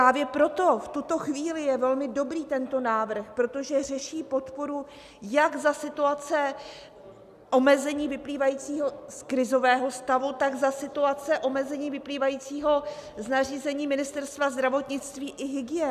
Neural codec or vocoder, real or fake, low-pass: none; real; 14.4 kHz